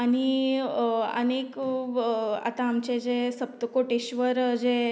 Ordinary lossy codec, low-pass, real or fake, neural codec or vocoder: none; none; real; none